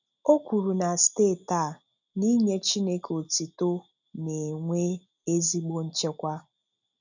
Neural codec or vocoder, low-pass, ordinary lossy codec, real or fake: none; 7.2 kHz; none; real